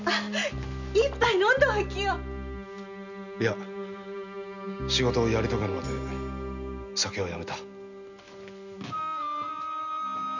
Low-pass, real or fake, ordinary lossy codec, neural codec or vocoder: 7.2 kHz; real; none; none